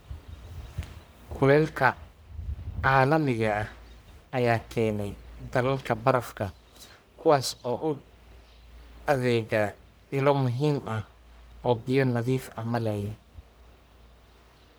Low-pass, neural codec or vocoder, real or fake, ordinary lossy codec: none; codec, 44.1 kHz, 1.7 kbps, Pupu-Codec; fake; none